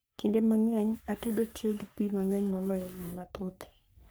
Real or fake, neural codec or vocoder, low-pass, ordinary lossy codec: fake; codec, 44.1 kHz, 3.4 kbps, Pupu-Codec; none; none